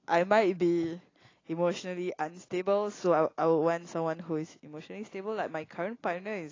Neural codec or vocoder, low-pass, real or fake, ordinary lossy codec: none; 7.2 kHz; real; AAC, 32 kbps